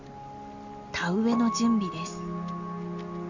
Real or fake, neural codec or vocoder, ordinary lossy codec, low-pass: real; none; none; 7.2 kHz